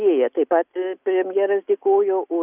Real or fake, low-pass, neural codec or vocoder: real; 3.6 kHz; none